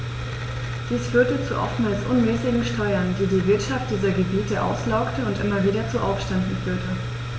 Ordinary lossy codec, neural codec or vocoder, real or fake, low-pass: none; none; real; none